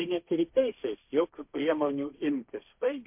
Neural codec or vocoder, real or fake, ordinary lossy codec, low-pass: codec, 16 kHz, 1.1 kbps, Voila-Tokenizer; fake; MP3, 32 kbps; 3.6 kHz